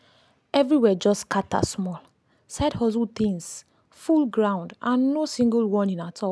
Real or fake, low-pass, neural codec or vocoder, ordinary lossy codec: real; none; none; none